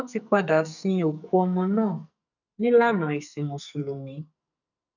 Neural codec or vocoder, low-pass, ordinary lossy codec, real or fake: codec, 44.1 kHz, 2.6 kbps, SNAC; 7.2 kHz; none; fake